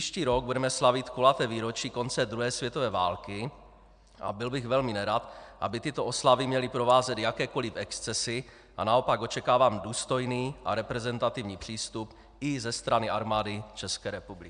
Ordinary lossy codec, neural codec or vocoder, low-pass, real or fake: MP3, 96 kbps; none; 9.9 kHz; real